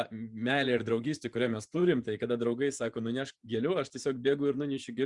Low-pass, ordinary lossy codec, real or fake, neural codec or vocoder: 10.8 kHz; Opus, 64 kbps; fake; vocoder, 24 kHz, 100 mel bands, Vocos